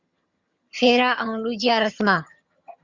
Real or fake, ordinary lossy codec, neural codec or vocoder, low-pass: fake; Opus, 64 kbps; vocoder, 22.05 kHz, 80 mel bands, HiFi-GAN; 7.2 kHz